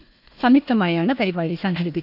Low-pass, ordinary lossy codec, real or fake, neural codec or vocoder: 5.4 kHz; none; fake; codec, 16 kHz, 1 kbps, FunCodec, trained on Chinese and English, 50 frames a second